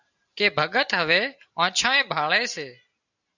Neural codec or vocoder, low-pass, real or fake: none; 7.2 kHz; real